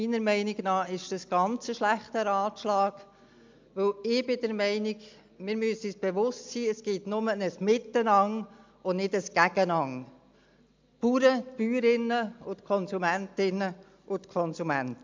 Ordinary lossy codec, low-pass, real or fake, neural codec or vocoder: MP3, 64 kbps; 7.2 kHz; real; none